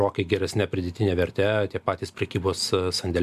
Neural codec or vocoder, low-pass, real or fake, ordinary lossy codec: none; 14.4 kHz; real; MP3, 96 kbps